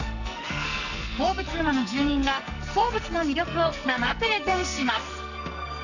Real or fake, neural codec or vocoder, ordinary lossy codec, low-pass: fake; codec, 44.1 kHz, 2.6 kbps, SNAC; none; 7.2 kHz